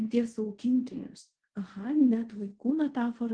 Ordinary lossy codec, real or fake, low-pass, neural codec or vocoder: Opus, 16 kbps; fake; 9.9 kHz; codec, 24 kHz, 0.5 kbps, DualCodec